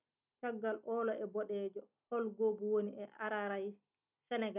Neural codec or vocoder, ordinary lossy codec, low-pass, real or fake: none; none; 3.6 kHz; real